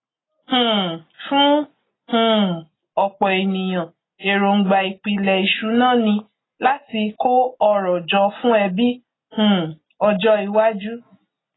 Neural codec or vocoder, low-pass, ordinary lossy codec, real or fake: none; 7.2 kHz; AAC, 16 kbps; real